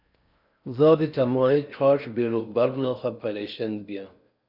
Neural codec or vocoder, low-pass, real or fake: codec, 16 kHz in and 24 kHz out, 0.6 kbps, FocalCodec, streaming, 4096 codes; 5.4 kHz; fake